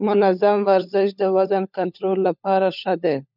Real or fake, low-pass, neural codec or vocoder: fake; 5.4 kHz; codec, 16 kHz, 16 kbps, FunCodec, trained on LibriTTS, 50 frames a second